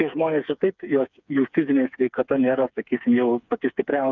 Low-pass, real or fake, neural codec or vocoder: 7.2 kHz; fake; codec, 16 kHz, 4 kbps, FreqCodec, smaller model